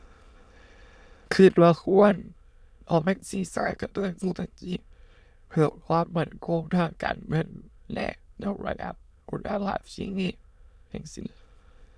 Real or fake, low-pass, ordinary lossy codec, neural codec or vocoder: fake; none; none; autoencoder, 22.05 kHz, a latent of 192 numbers a frame, VITS, trained on many speakers